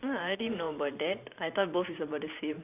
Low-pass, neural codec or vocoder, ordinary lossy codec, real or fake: 3.6 kHz; vocoder, 44.1 kHz, 128 mel bands every 512 samples, BigVGAN v2; none; fake